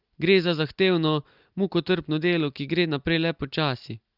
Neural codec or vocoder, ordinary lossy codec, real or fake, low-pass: none; Opus, 32 kbps; real; 5.4 kHz